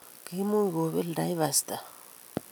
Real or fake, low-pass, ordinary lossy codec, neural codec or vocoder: real; none; none; none